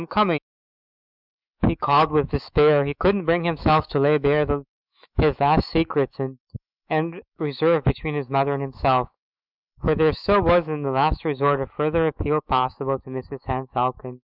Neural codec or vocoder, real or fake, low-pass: autoencoder, 48 kHz, 128 numbers a frame, DAC-VAE, trained on Japanese speech; fake; 5.4 kHz